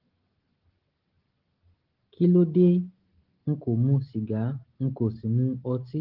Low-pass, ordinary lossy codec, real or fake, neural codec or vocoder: 5.4 kHz; Opus, 16 kbps; real; none